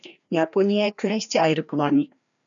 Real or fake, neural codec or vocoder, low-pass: fake; codec, 16 kHz, 1 kbps, FreqCodec, larger model; 7.2 kHz